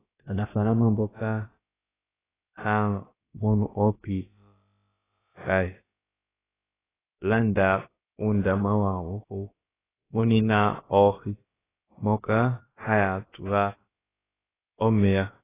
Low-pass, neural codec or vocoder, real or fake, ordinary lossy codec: 3.6 kHz; codec, 16 kHz, about 1 kbps, DyCAST, with the encoder's durations; fake; AAC, 16 kbps